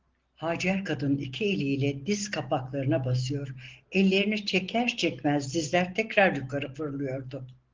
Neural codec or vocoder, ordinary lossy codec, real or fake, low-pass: none; Opus, 16 kbps; real; 7.2 kHz